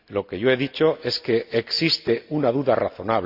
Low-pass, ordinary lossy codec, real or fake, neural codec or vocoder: 5.4 kHz; Opus, 64 kbps; real; none